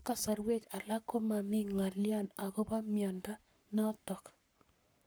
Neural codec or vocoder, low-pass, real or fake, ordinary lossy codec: codec, 44.1 kHz, 7.8 kbps, Pupu-Codec; none; fake; none